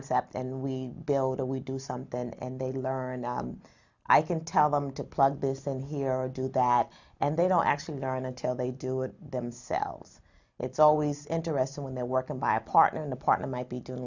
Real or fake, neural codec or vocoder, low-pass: real; none; 7.2 kHz